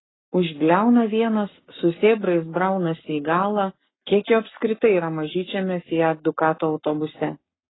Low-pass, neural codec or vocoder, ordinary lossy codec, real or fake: 7.2 kHz; codec, 44.1 kHz, 7.8 kbps, Pupu-Codec; AAC, 16 kbps; fake